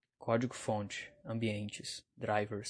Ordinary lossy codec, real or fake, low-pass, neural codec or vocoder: MP3, 48 kbps; real; 9.9 kHz; none